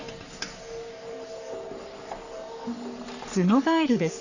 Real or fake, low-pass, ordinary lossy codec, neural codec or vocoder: fake; 7.2 kHz; none; codec, 44.1 kHz, 3.4 kbps, Pupu-Codec